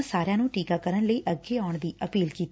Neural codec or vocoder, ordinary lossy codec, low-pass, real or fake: none; none; none; real